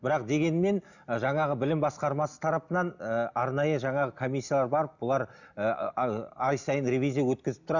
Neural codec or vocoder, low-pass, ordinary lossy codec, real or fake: none; 7.2 kHz; none; real